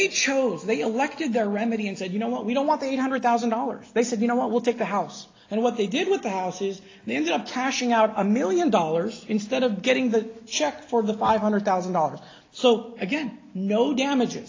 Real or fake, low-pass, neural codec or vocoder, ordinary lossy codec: real; 7.2 kHz; none; AAC, 32 kbps